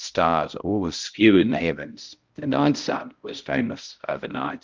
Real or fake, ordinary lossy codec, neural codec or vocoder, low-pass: fake; Opus, 24 kbps; codec, 16 kHz, 0.5 kbps, X-Codec, HuBERT features, trained on balanced general audio; 7.2 kHz